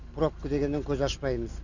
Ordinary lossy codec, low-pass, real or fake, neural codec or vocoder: none; 7.2 kHz; real; none